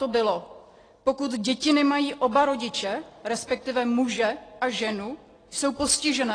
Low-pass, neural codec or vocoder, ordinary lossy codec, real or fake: 9.9 kHz; none; AAC, 32 kbps; real